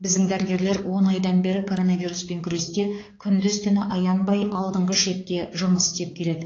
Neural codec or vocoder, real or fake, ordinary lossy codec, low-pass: codec, 16 kHz, 4 kbps, X-Codec, HuBERT features, trained on balanced general audio; fake; AAC, 32 kbps; 7.2 kHz